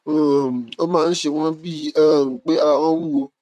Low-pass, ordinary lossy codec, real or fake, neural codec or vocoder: 14.4 kHz; none; fake; vocoder, 44.1 kHz, 128 mel bands, Pupu-Vocoder